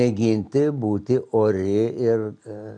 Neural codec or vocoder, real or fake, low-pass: none; real; 9.9 kHz